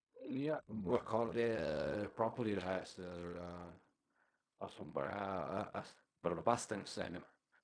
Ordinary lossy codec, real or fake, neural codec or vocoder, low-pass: none; fake; codec, 16 kHz in and 24 kHz out, 0.4 kbps, LongCat-Audio-Codec, fine tuned four codebook decoder; 9.9 kHz